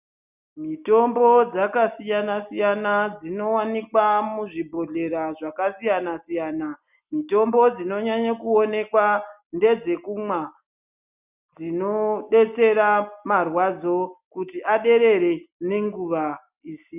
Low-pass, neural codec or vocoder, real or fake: 3.6 kHz; none; real